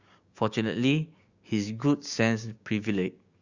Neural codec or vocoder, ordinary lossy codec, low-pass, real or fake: codec, 16 kHz, 6 kbps, DAC; Opus, 64 kbps; 7.2 kHz; fake